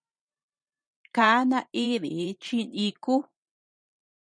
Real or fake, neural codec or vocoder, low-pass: fake; vocoder, 44.1 kHz, 128 mel bands every 512 samples, BigVGAN v2; 9.9 kHz